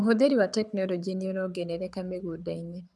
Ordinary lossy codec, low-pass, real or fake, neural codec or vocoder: none; none; fake; codec, 24 kHz, 6 kbps, HILCodec